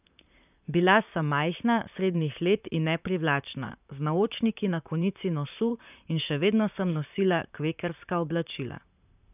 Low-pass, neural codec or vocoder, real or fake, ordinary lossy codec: 3.6 kHz; vocoder, 44.1 kHz, 128 mel bands, Pupu-Vocoder; fake; none